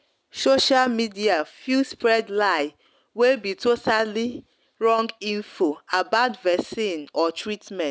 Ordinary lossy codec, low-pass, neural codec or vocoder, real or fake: none; none; none; real